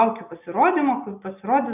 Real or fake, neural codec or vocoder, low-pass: real; none; 3.6 kHz